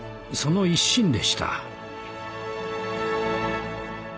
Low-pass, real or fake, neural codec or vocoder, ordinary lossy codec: none; real; none; none